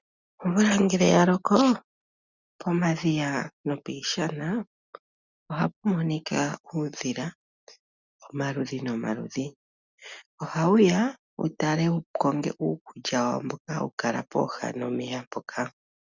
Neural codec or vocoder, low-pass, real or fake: none; 7.2 kHz; real